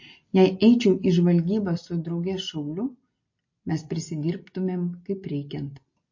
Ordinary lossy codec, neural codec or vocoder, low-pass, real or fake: MP3, 32 kbps; none; 7.2 kHz; real